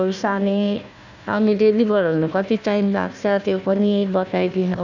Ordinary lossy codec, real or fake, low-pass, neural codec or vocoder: none; fake; 7.2 kHz; codec, 16 kHz, 1 kbps, FunCodec, trained on Chinese and English, 50 frames a second